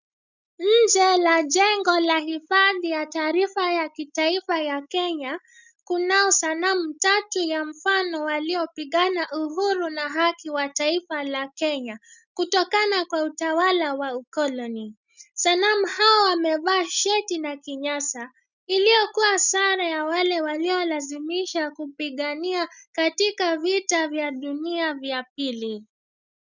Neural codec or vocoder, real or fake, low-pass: none; real; 7.2 kHz